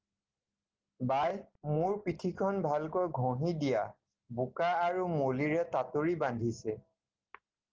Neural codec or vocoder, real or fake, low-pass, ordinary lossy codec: none; real; 7.2 kHz; Opus, 16 kbps